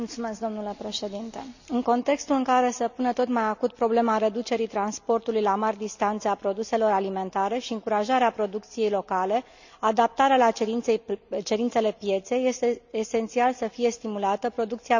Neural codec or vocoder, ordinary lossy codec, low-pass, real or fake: none; none; 7.2 kHz; real